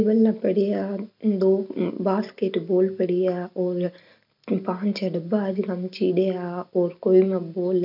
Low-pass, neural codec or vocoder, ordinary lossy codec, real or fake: 5.4 kHz; none; none; real